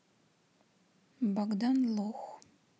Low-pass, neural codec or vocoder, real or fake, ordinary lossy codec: none; none; real; none